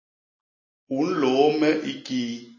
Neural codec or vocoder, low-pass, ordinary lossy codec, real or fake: none; 7.2 kHz; MP3, 32 kbps; real